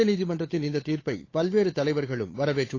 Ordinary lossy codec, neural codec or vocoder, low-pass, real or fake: AAC, 32 kbps; codec, 16 kHz, 8 kbps, FunCodec, trained on Chinese and English, 25 frames a second; 7.2 kHz; fake